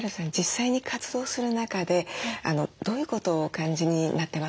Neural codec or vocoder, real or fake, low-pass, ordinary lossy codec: none; real; none; none